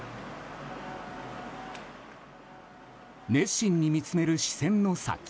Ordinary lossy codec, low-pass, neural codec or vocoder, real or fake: none; none; none; real